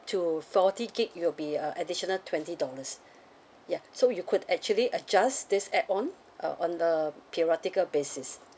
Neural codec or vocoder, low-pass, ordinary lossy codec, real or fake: none; none; none; real